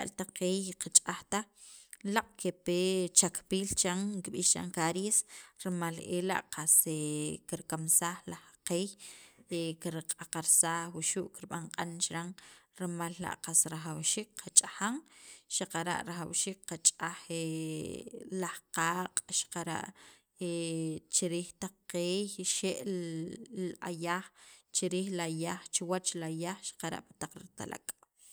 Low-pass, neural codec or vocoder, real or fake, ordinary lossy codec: none; none; real; none